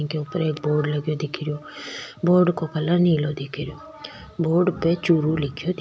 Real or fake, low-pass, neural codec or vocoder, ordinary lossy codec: real; none; none; none